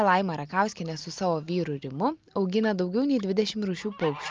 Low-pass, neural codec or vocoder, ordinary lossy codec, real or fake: 7.2 kHz; none; Opus, 24 kbps; real